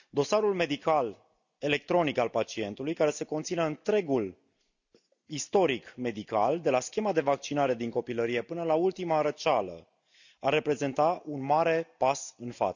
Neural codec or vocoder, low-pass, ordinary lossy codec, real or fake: none; 7.2 kHz; none; real